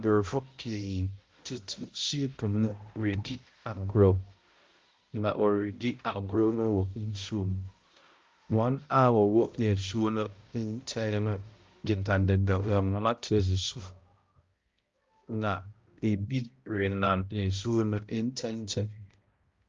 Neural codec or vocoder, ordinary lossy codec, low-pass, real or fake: codec, 16 kHz, 0.5 kbps, X-Codec, HuBERT features, trained on balanced general audio; Opus, 32 kbps; 7.2 kHz; fake